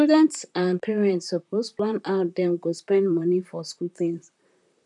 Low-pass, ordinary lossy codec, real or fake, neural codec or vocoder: 10.8 kHz; none; fake; vocoder, 44.1 kHz, 128 mel bands, Pupu-Vocoder